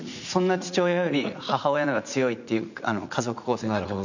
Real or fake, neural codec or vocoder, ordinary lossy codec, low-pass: fake; vocoder, 44.1 kHz, 80 mel bands, Vocos; none; 7.2 kHz